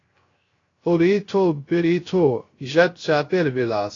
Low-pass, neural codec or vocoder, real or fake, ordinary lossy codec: 7.2 kHz; codec, 16 kHz, 0.3 kbps, FocalCodec; fake; AAC, 32 kbps